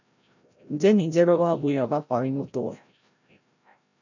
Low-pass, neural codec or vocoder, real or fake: 7.2 kHz; codec, 16 kHz, 0.5 kbps, FreqCodec, larger model; fake